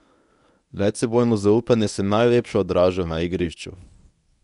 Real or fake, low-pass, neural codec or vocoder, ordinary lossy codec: fake; 10.8 kHz; codec, 24 kHz, 0.9 kbps, WavTokenizer, medium speech release version 1; none